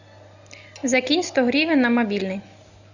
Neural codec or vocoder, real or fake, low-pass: none; real; 7.2 kHz